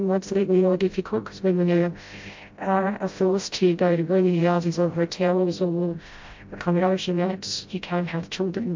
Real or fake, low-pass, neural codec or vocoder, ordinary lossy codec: fake; 7.2 kHz; codec, 16 kHz, 0.5 kbps, FreqCodec, smaller model; MP3, 48 kbps